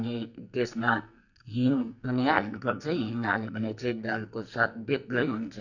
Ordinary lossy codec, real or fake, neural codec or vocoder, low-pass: none; fake; codec, 44.1 kHz, 2.6 kbps, SNAC; 7.2 kHz